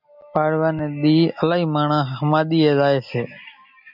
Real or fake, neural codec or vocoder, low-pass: real; none; 5.4 kHz